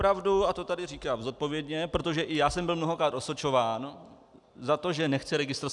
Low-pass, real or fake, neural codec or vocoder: 10.8 kHz; real; none